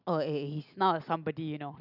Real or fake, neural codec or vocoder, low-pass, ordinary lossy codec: real; none; 5.4 kHz; none